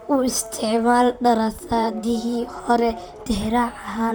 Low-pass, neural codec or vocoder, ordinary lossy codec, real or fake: none; vocoder, 44.1 kHz, 128 mel bands, Pupu-Vocoder; none; fake